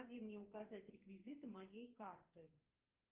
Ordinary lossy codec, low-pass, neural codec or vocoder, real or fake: Opus, 24 kbps; 3.6 kHz; codec, 44.1 kHz, 2.6 kbps, SNAC; fake